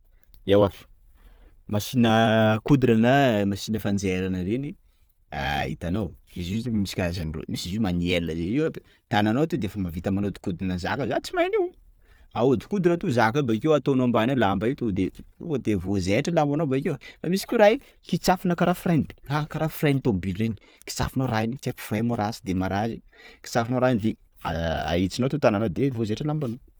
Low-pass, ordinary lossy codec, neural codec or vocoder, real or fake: none; none; none; real